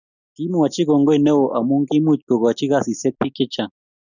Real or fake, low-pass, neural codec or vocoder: real; 7.2 kHz; none